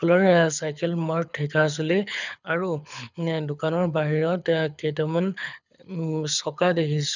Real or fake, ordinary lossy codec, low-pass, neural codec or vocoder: fake; none; 7.2 kHz; codec, 24 kHz, 6 kbps, HILCodec